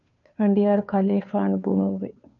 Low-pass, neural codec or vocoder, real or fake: 7.2 kHz; codec, 16 kHz, 2 kbps, FunCodec, trained on Chinese and English, 25 frames a second; fake